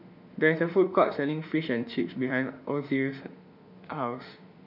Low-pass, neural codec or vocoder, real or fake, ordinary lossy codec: 5.4 kHz; autoencoder, 48 kHz, 32 numbers a frame, DAC-VAE, trained on Japanese speech; fake; none